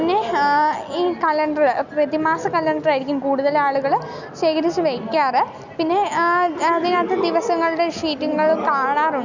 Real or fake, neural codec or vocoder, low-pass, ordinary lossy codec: real; none; 7.2 kHz; none